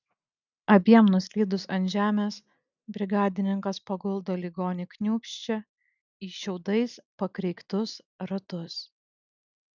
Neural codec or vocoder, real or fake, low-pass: none; real; 7.2 kHz